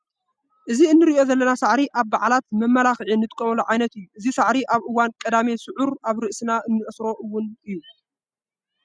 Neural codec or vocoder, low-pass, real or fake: none; 9.9 kHz; real